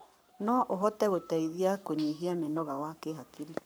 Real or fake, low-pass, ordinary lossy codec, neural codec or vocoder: fake; none; none; codec, 44.1 kHz, 7.8 kbps, Pupu-Codec